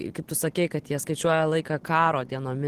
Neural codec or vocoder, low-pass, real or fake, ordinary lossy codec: none; 14.4 kHz; real; Opus, 16 kbps